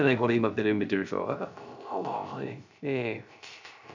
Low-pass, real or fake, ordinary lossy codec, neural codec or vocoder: 7.2 kHz; fake; MP3, 64 kbps; codec, 16 kHz, 0.3 kbps, FocalCodec